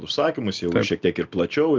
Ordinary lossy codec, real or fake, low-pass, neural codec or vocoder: Opus, 32 kbps; real; 7.2 kHz; none